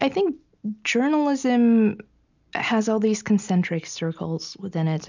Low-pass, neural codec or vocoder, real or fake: 7.2 kHz; none; real